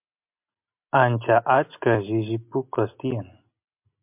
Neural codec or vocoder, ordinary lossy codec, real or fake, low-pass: none; MP3, 32 kbps; real; 3.6 kHz